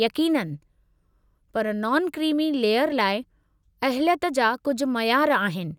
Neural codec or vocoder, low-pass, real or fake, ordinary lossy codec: none; 19.8 kHz; real; none